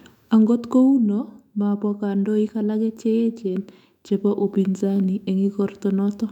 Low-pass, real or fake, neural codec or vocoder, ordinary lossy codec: 19.8 kHz; fake; autoencoder, 48 kHz, 128 numbers a frame, DAC-VAE, trained on Japanese speech; none